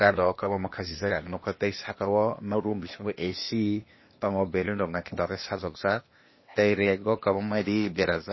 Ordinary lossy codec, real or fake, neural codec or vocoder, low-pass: MP3, 24 kbps; fake; codec, 16 kHz, 0.8 kbps, ZipCodec; 7.2 kHz